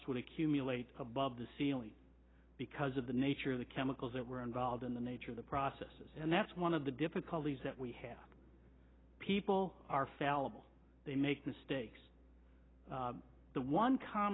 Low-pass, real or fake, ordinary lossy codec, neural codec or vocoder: 7.2 kHz; real; AAC, 16 kbps; none